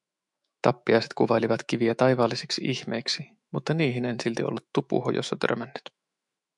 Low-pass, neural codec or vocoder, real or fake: 10.8 kHz; autoencoder, 48 kHz, 128 numbers a frame, DAC-VAE, trained on Japanese speech; fake